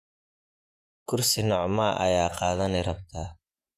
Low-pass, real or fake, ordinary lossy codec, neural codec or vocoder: 14.4 kHz; fake; none; vocoder, 48 kHz, 128 mel bands, Vocos